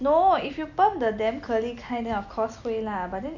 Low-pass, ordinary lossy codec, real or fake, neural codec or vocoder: 7.2 kHz; none; real; none